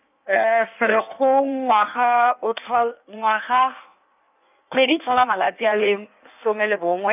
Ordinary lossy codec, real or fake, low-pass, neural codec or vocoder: none; fake; 3.6 kHz; codec, 16 kHz in and 24 kHz out, 1.1 kbps, FireRedTTS-2 codec